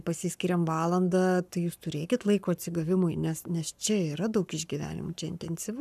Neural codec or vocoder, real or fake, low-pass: codec, 44.1 kHz, 7.8 kbps, Pupu-Codec; fake; 14.4 kHz